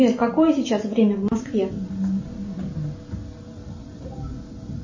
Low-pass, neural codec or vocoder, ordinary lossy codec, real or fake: 7.2 kHz; none; MP3, 32 kbps; real